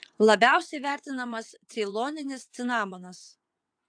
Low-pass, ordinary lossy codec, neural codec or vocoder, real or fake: 9.9 kHz; AAC, 64 kbps; vocoder, 22.05 kHz, 80 mel bands, WaveNeXt; fake